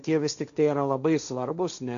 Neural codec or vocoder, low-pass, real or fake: codec, 16 kHz, 1.1 kbps, Voila-Tokenizer; 7.2 kHz; fake